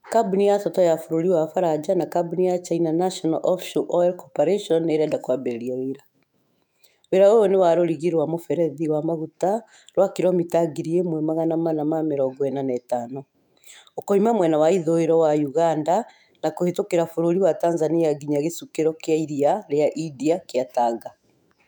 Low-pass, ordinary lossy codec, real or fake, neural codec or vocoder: 19.8 kHz; none; fake; autoencoder, 48 kHz, 128 numbers a frame, DAC-VAE, trained on Japanese speech